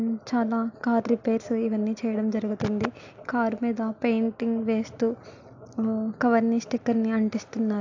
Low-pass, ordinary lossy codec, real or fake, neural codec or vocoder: 7.2 kHz; AAC, 48 kbps; real; none